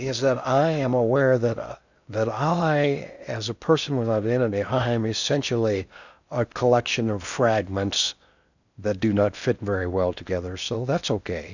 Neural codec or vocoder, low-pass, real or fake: codec, 16 kHz in and 24 kHz out, 0.6 kbps, FocalCodec, streaming, 4096 codes; 7.2 kHz; fake